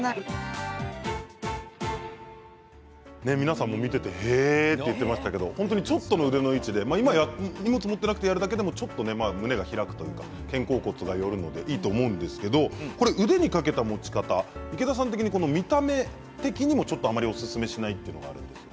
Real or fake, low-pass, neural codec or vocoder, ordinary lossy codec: real; none; none; none